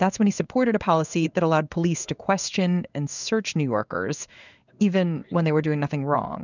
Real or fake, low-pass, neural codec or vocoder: fake; 7.2 kHz; codec, 16 kHz in and 24 kHz out, 1 kbps, XY-Tokenizer